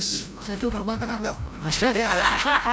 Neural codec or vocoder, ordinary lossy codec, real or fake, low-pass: codec, 16 kHz, 0.5 kbps, FreqCodec, larger model; none; fake; none